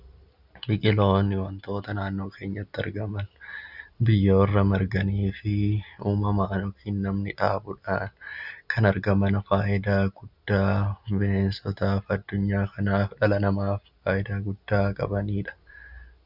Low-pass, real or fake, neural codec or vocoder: 5.4 kHz; real; none